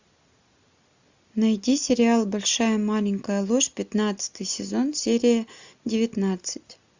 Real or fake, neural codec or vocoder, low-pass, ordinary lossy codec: real; none; 7.2 kHz; Opus, 64 kbps